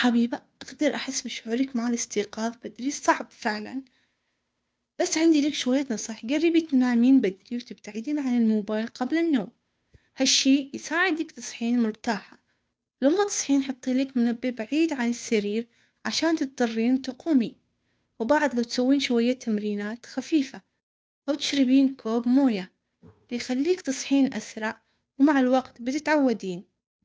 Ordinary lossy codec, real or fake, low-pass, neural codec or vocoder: none; fake; none; codec, 16 kHz, 2 kbps, FunCodec, trained on Chinese and English, 25 frames a second